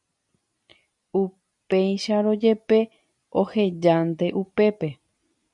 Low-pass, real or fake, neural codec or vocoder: 10.8 kHz; real; none